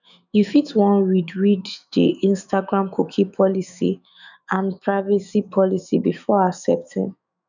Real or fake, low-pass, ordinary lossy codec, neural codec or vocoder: fake; 7.2 kHz; none; autoencoder, 48 kHz, 128 numbers a frame, DAC-VAE, trained on Japanese speech